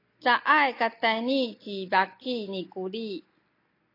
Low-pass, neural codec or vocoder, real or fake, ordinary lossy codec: 5.4 kHz; none; real; AAC, 32 kbps